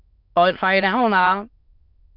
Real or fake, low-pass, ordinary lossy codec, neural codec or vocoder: fake; 5.4 kHz; none; autoencoder, 22.05 kHz, a latent of 192 numbers a frame, VITS, trained on many speakers